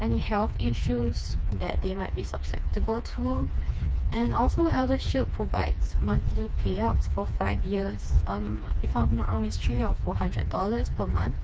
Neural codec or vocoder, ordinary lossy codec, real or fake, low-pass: codec, 16 kHz, 2 kbps, FreqCodec, smaller model; none; fake; none